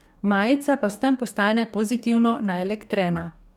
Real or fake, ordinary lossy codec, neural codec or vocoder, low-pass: fake; none; codec, 44.1 kHz, 2.6 kbps, DAC; 19.8 kHz